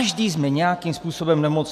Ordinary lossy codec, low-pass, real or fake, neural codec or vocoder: MP3, 96 kbps; 14.4 kHz; real; none